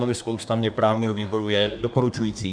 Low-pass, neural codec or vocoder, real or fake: 9.9 kHz; codec, 24 kHz, 1 kbps, SNAC; fake